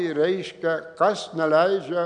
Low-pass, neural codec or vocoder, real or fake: 9.9 kHz; none; real